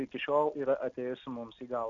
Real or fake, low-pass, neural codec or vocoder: real; 7.2 kHz; none